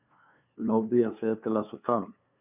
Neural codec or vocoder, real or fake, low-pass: codec, 16 kHz, 2 kbps, FunCodec, trained on LibriTTS, 25 frames a second; fake; 3.6 kHz